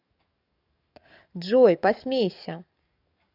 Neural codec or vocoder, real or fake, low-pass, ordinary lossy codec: codec, 44.1 kHz, 7.8 kbps, DAC; fake; 5.4 kHz; none